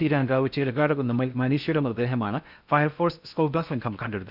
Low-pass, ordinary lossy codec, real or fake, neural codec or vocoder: 5.4 kHz; none; fake; codec, 16 kHz in and 24 kHz out, 0.6 kbps, FocalCodec, streaming, 4096 codes